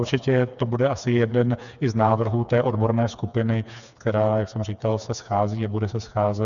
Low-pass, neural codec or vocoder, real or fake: 7.2 kHz; codec, 16 kHz, 4 kbps, FreqCodec, smaller model; fake